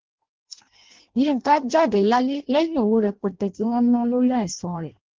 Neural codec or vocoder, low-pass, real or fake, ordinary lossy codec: codec, 16 kHz in and 24 kHz out, 0.6 kbps, FireRedTTS-2 codec; 7.2 kHz; fake; Opus, 16 kbps